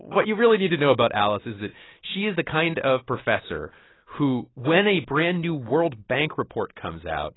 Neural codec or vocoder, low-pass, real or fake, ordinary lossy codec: none; 7.2 kHz; real; AAC, 16 kbps